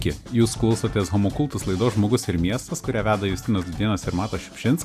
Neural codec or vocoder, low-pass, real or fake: none; 14.4 kHz; real